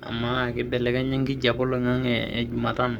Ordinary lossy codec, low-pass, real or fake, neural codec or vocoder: none; 19.8 kHz; fake; codec, 44.1 kHz, 7.8 kbps, Pupu-Codec